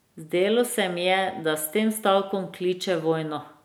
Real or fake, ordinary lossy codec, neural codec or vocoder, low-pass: real; none; none; none